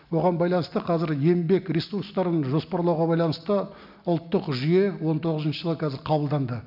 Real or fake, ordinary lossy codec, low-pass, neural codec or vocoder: real; none; 5.4 kHz; none